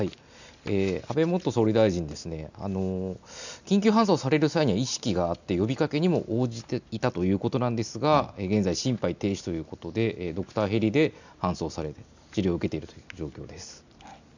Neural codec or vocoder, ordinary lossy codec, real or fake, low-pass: none; none; real; 7.2 kHz